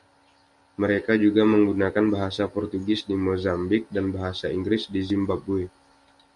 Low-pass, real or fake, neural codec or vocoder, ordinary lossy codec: 10.8 kHz; real; none; Opus, 64 kbps